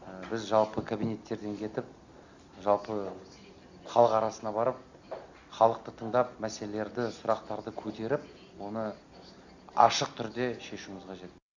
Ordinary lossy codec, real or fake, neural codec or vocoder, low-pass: Opus, 64 kbps; real; none; 7.2 kHz